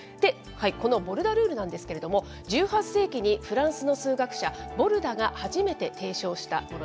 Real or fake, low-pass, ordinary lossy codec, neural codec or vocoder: real; none; none; none